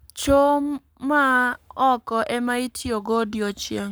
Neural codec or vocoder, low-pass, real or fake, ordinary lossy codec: codec, 44.1 kHz, 7.8 kbps, Pupu-Codec; none; fake; none